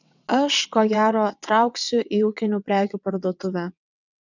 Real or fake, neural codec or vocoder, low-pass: fake; vocoder, 22.05 kHz, 80 mel bands, WaveNeXt; 7.2 kHz